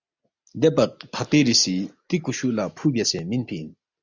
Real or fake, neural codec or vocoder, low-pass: real; none; 7.2 kHz